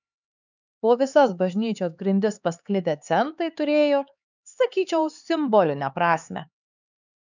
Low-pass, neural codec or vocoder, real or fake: 7.2 kHz; codec, 16 kHz, 4 kbps, X-Codec, HuBERT features, trained on LibriSpeech; fake